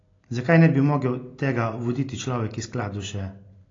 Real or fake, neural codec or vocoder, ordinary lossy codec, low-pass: real; none; AAC, 32 kbps; 7.2 kHz